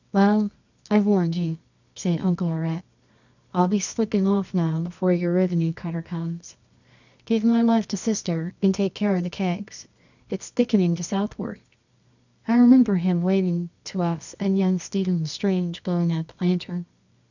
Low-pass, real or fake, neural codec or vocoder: 7.2 kHz; fake; codec, 24 kHz, 0.9 kbps, WavTokenizer, medium music audio release